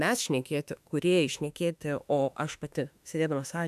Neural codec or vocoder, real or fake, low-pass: autoencoder, 48 kHz, 32 numbers a frame, DAC-VAE, trained on Japanese speech; fake; 14.4 kHz